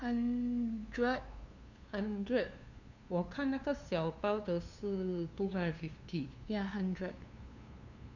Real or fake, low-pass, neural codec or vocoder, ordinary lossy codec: fake; 7.2 kHz; codec, 16 kHz, 2 kbps, FunCodec, trained on LibriTTS, 25 frames a second; none